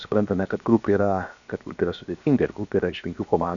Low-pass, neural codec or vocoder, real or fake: 7.2 kHz; codec, 16 kHz, 0.7 kbps, FocalCodec; fake